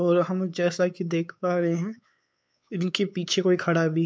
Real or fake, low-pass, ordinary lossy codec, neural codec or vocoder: fake; none; none; codec, 16 kHz, 4 kbps, X-Codec, WavLM features, trained on Multilingual LibriSpeech